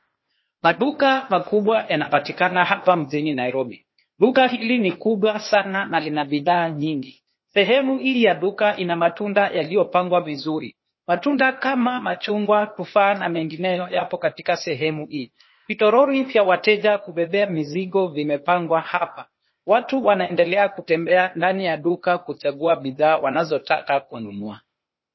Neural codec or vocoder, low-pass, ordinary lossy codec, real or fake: codec, 16 kHz, 0.8 kbps, ZipCodec; 7.2 kHz; MP3, 24 kbps; fake